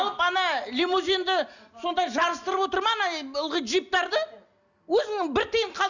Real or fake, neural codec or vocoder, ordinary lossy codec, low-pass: real; none; none; 7.2 kHz